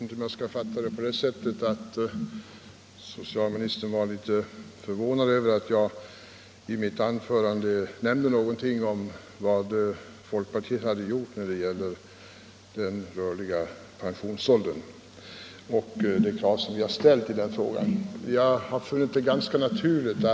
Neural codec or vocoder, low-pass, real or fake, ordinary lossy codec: none; none; real; none